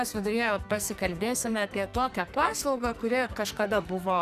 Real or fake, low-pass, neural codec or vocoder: fake; 14.4 kHz; codec, 44.1 kHz, 2.6 kbps, SNAC